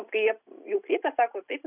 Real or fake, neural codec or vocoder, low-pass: real; none; 3.6 kHz